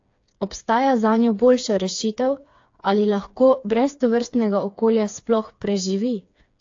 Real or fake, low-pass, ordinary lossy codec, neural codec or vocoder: fake; 7.2 kHz; AAC, 48 kbps; codec, 16 kHz, 4 kbps, FreqCodec, smaller model